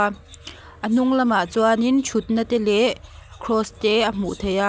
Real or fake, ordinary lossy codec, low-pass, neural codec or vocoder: real; none; none; none